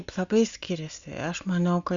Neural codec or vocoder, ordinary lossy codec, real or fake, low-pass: none; Opus, 64 kbps; real; 7.2 kHz